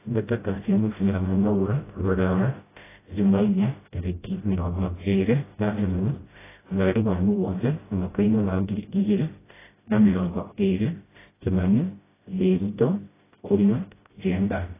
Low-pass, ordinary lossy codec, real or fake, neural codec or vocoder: 3.6 kHz; AAC, 16 kbps; fake; codec, 16 kHz, 0.5 kbps, FreqCodec, smaller model